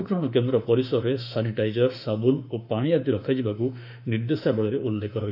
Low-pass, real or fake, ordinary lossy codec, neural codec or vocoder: 5.4 kHz; fake; none; autoencoder, 48 kHz, 32 numbers a frame, DAC-VAE, trained on Japanese speech